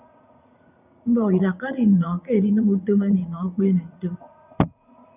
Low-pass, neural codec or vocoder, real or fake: 3.6 kHz; vocoder, 44.1 kHz, 80 mel bands, Vocos; fake